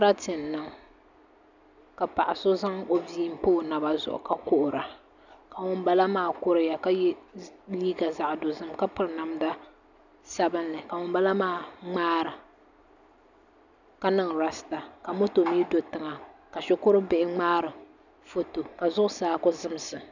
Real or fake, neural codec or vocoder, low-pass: real; none; 7.2 kHz